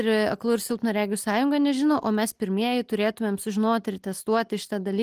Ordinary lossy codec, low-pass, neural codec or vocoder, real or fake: Opus, 24 kbps; 14.4 kHz; none; real